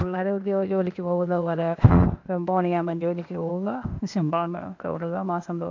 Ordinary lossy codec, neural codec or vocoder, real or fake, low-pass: MP3, 64 kbps; codec, 16 kHz, 0.8 kbps, ZipCodec; fake; 7.2 kHz